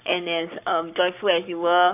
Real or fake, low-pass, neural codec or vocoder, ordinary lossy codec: fake; 3.6 kHz; codec, 44.1 kHz, 7.8 kbps, Pupu-Codec; none